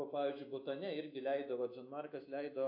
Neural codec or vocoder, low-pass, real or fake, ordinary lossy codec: autoencoder, 48 kHz, 128 numbers a frame, DAC-VAE, trained on Japanese speech; 5.4 kHz; fake; AAC, 32 kbps